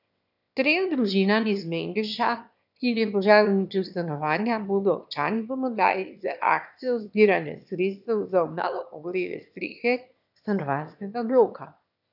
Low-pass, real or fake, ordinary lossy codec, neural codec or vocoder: 5.4 kHz; fake; none; autoencoder, 22.05 kHz, a latent of 192 numbers a frame, VITS, trained on one speaker